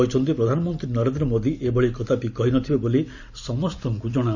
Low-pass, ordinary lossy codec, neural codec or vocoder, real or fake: 7.2 kHz; none; none; real